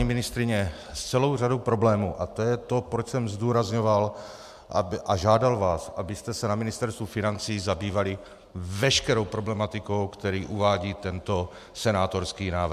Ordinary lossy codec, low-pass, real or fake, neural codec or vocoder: MP3, 96 kbps; 14.4 kHz; real; none